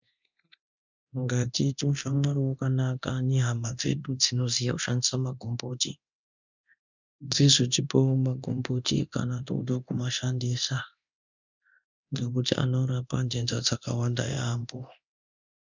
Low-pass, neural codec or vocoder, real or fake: 7.2 kHz; codec, 24 kHz, 0.9 kbps, DualCodec; fake